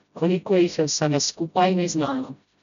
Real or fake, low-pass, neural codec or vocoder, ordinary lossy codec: fake; 7.2 kHz; codec, 16 kHz, 0.5 kbps, FreqCodec, smaller model; none